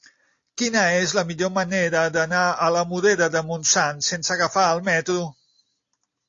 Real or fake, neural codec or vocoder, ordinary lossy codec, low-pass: real; none; AAC, 48 kbps; 7.2 kHz